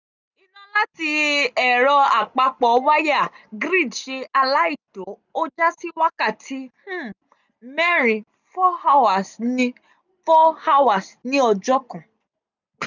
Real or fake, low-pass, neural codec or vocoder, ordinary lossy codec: real; 7.2 kHz; none; none